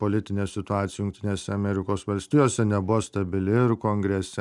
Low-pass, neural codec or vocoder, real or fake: 10.8 kHz; none; real